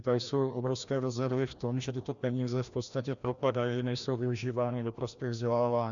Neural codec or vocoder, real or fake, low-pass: codec, 16 kHz, 1 kbps, FreqCodec, larger model; fake; 7.2 kHz